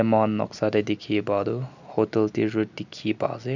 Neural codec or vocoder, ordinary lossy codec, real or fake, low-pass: none; none; real; 7.2 kHz